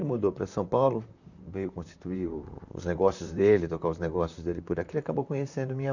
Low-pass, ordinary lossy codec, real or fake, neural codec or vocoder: 7.2 kHz; none; fake; vocoder, 44.1 kHz, 128 mel bands, Pupu-Vocoder